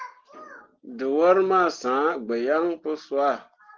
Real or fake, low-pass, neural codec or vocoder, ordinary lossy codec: real; 7.2 kHz; none; Opus, 16 kbps